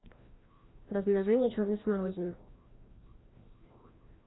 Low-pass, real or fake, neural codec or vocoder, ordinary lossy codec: 7.2 kHz; fake; codec, 16 kHz, 1 kbps, FreqCodec, larger model; AAC, 16 kbps